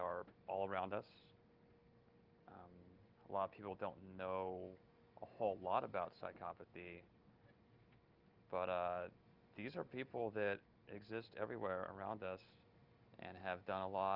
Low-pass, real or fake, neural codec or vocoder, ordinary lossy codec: 5.4 kHz; real; none; Opus, 32 kbps